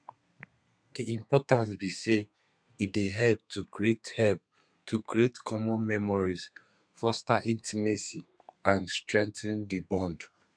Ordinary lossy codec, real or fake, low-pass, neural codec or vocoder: none; fake; 9.9 kHz; codec, 32 kHz, 1.9 kbps, SNAC